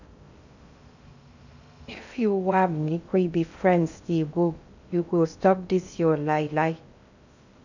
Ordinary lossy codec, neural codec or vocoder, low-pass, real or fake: none; codec, 16 kHz in and 24 kHz out, 0.6 kbps, FocalCodec, streaming, 2048 codes; 7.2 kHz; fake